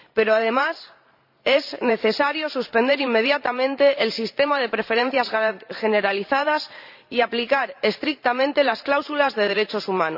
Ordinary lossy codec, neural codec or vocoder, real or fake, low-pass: none; vocoder, 44.1 kHz, 128 mel bands every 512 samples, BigVGAN v2; fake; 5.4 kHz